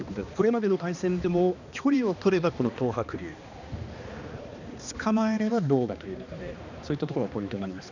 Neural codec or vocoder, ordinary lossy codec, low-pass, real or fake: codec, 16 kHz, 2 kbps, X-Codec, HuBERT features, trained on balanced general audio; none; 7.2 kHz; fake